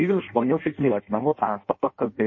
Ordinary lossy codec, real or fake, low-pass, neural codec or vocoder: MP3, 32 kbps; fake; 7.2 kHz; codec, 16 kHz in and 24 kHz out, 0.6 kbps, FireRedTTS-2 codec